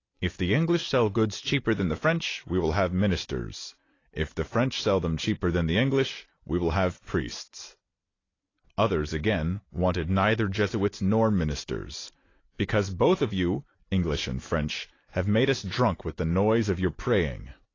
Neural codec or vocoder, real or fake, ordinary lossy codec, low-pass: none; real; AAC, 32 kbps; 7.2 kHz